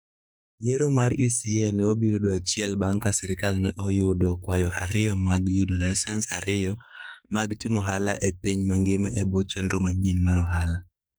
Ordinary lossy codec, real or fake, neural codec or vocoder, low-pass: none; fake; codec, 44.1 kHz, 2.6 kbps, SNAC; none